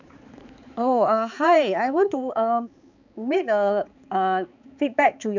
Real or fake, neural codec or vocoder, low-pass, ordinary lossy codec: fake; codec, 16 kHz, 4 kbps, X-Codec, HuBERT features, trained on balanced general audio; 7.2 kHz; none